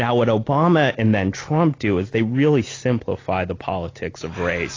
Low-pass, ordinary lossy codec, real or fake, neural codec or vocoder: 7.2 kHz; AAC, 32 kbps; real; none